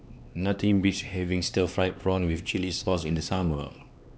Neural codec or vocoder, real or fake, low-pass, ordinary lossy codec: codec, 16 kHz, 2 kbps, X-Codec, HuBERT features, trained on LibriSpeech; fake; none; none